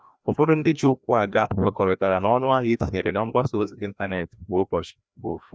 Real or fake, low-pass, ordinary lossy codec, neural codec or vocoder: fake; none; none; codec, 16 kHz, 1 kbps, FreqCodec, larger model